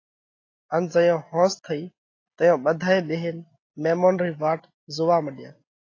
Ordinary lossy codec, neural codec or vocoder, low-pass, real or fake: AAC, 32 kbps; none; 7.2 kHz; real